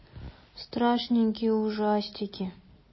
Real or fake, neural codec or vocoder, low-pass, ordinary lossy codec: real; none; 7.2 kHz; MP3, 24 kbps